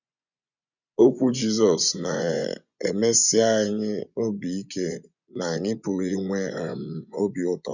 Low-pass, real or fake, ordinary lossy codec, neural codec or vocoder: 7.2 kHz; fake; none; vocoder, 24 kHz, 100 mel bands, Vocos